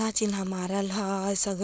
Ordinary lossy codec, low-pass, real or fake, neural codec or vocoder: none; none; fake; codec, 16 kHz, 4.8 kbps, FACodec